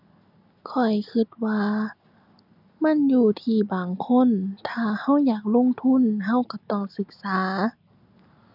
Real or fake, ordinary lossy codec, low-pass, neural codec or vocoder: real; none; 5.4 kHz; none